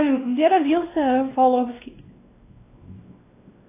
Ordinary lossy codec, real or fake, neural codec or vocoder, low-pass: AAC, 24 kbps; fake; codec, 16 kHz, 1 kbps, X-Codec, WavLM features, trained on Multilingual LibriSpeech; 3.6 kHz